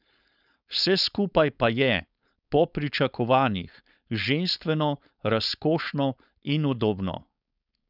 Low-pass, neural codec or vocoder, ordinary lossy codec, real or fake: 5.4 kHz; codec, 16 kHz, 4.8 kbps, FACodec; none; fake